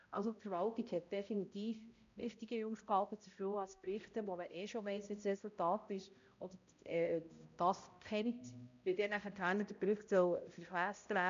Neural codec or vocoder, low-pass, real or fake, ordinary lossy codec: codec, 16 kHz, 0.5 kbps, X-Codec, HuBERT features, trained on balanced general audio; 7.2 kHz; fake; MP3, 64 kbps